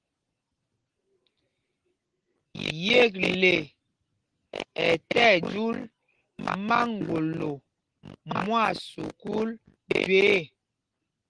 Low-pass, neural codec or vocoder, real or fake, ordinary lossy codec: 9.9 kHz; none; real; Opus, 16 kbps